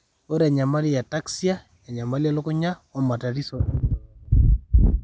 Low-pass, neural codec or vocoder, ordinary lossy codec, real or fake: none; none; none; real